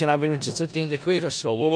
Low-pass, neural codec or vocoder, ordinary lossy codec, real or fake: 9.9 kHz; codec, 16 kHz in and 24 kHz out, 0.4 kbps, LongCat-Audio-Codec, four codebook decoder; AAC, 64 kbps; fake